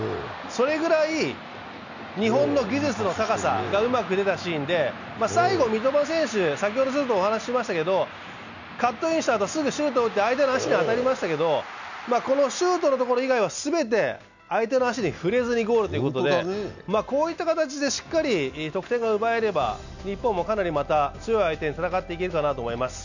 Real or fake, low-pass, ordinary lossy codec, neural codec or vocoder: real; 7.2 kHz; none; none